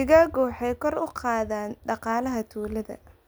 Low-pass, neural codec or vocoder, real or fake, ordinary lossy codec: none; none; real; none